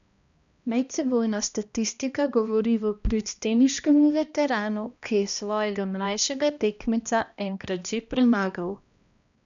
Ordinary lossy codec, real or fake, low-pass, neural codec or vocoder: none; fake; 7.2 kHz; codec, 16 kHz, 1 kbps, X-Codec, HuBERT features, trained on balanced general audio